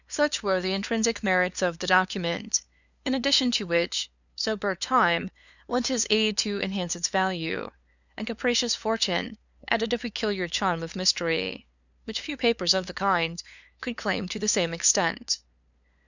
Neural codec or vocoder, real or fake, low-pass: codec, 16 kHz, 2 kbps, FunCodec, trained on LibriTTS, 25 frames a second; fake; 7.2 kHz